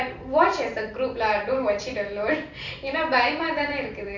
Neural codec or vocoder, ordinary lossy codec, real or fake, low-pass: vocoder, 44.1 kHz, 128 mel bands every 256 samples, BigVGAN v2; none; fake; 7.2 kHz